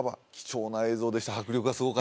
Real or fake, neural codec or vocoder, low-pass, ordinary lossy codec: real; none; none; none